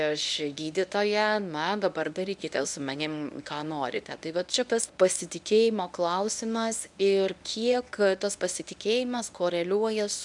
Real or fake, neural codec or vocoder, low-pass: fake; codec, 24 kHz, 0.9 kbps, WavTokenizer, medium speech release version 1; 10.8 kHz